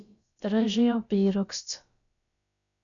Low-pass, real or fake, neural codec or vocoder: 7.2 kHz; fake; codec, 16 kHz, about 1 kbps, DyCAST, with the encoder's durations